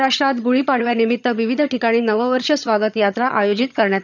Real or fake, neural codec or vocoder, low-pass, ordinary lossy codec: fake; vocoder, 22.05 kHz, 80 mel bands, HiFi-GAN; 7.2 kHz; none